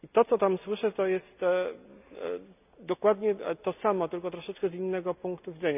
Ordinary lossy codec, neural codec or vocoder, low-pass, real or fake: none; none; 3.6 kHz; real